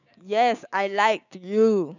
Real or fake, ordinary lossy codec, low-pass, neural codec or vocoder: fake; none; 7.2 kHz; codec, 16 kHz, 6 kbps, DAC